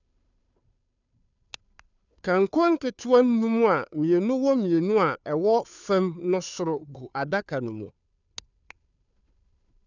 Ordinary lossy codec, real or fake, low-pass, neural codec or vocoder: none; fake; 7.2 kHz; codec, 16 kHz, 2 kbps, FunCodec, trained on Chinese and English, 25 frames a second